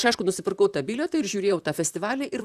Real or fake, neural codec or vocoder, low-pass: fake; vocoder, 44.1 kHz, 128 mel bands, Pupu-Vocoder; 14.4 kHz